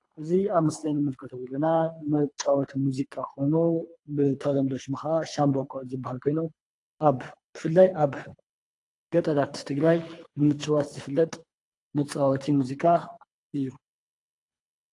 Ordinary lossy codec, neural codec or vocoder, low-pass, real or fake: AAC, 48 kbps; codec, 24 kHz, 3 kbps, HILCodec; 10.8 kHz; fake